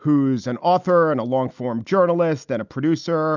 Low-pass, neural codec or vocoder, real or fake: 7.2 kHz; none; real